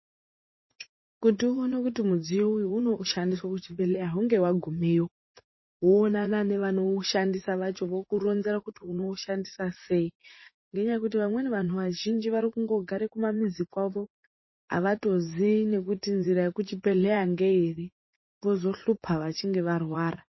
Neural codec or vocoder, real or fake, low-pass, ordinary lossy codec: vocoder, 44.1 kHz, 80 mel bands, Vocos; fake; 7.2 kHz; MP3, 24 kbps